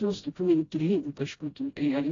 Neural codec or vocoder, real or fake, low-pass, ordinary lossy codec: codec, 16 kHz, 0.5 kbps, FreqCodec, smaller model; fake; 7.2 kHz; AAC, 32 kbps